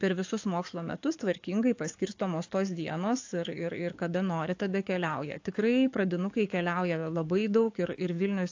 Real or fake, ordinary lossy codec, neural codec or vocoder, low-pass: fake; AAC, 48 kbps; codec, 44.1 kHz, 7.8 kbps, DAC; 7.2 kHz